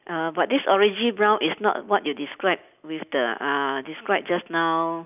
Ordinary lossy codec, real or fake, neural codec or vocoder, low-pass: none; real; none; 3.6 kHz